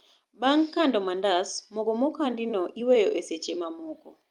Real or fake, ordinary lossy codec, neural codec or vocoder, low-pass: fake; Opus, 32 kbps; vocoder, 44.1 kHz, 128 mel bands every 256 samples, BigVGAN v2; 19.8 kHz